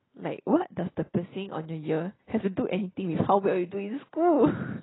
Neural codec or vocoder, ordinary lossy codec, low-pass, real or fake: none; AAC, 16 kbps; 7.2 kHz; real